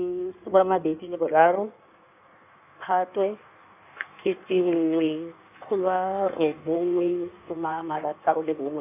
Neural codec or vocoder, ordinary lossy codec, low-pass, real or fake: codec, 16 kHz in and 24 kHz out, 1.1 kbps, FireRedTTS-2 codec; none; 3.6 kHz; fake